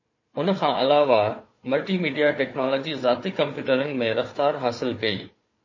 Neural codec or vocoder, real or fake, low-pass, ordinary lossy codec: codec, 16 kHz, 4 kbps, FunCodec, trained on Chinese and English, 50 frames a second; fake; 7.2 kHz; MP3, 32 kbps